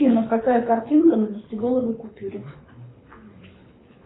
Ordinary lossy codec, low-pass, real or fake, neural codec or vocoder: AAC, 16 kbps; 7.2 kHz; fake; codec, 24 kHz, 6 kbps, HILCodec